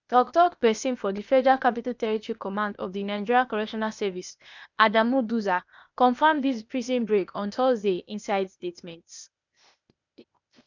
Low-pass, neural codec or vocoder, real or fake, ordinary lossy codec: 7.2 kHz; codec, 16 kHz, 0.8 kbps, ZipCodec; fake; none